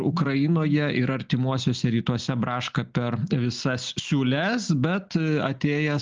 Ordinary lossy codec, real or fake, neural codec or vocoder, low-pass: Opus, 24 kbps; real; none; 7.2 kHz